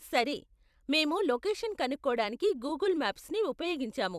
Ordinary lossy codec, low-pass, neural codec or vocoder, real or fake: none; 14.4 kHz; vocoder, 44.1 kHz, 128 mel bands every 256 samples, BigVGAN v2; fake